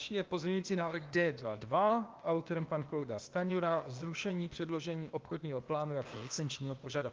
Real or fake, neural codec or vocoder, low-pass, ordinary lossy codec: fake; codec, 16 kHz, 0.8 kbps, ZipCodec; 7.2 kHz; Opus, 24 kbps